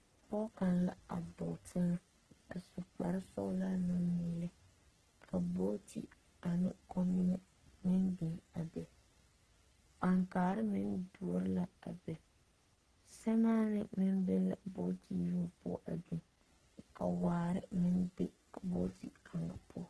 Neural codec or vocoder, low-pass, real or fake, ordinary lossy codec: codec, 44.1 kHz, 3.4 kbps, Pupu-Codec; 10.8 kHz; fake; Opus, 16 kbps